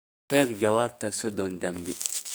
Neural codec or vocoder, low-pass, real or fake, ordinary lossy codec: codec, 44.1 kHz, 2.6 kbps, SNAC; none; fake; none